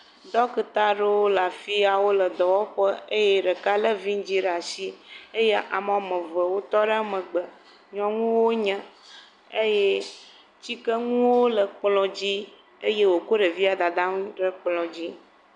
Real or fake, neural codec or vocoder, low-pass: real; none; 10.8 kHz